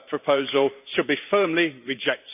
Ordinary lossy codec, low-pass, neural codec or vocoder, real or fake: none; 3.6 kHz; vocoder, 44.1 kHz, 128 mel bands every 512 samples, BigVGAN v2; fake